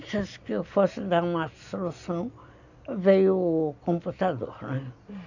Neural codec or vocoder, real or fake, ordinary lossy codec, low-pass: none; real; none; 7.2 kHz